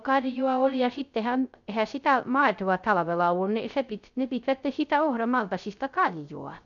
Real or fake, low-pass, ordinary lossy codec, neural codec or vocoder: fake; 7.2 kHz; none; codec, 16 kHz, 0.3 kbps, FocalCodec